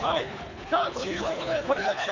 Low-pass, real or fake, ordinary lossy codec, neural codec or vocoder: 7.2 kHz; fake; MP3, 64 kbps; codec, 24 kHz, 3 kbps, HILCodec